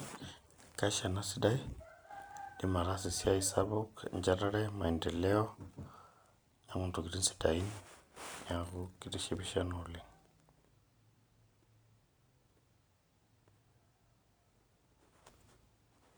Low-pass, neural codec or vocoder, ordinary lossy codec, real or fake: none; none; none; real